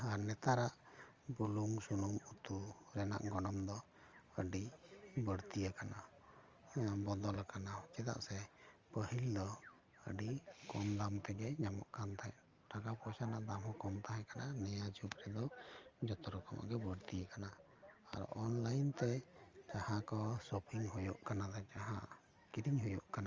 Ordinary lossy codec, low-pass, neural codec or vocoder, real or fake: Opus, 32 kbps; 7.2 kHz; none; real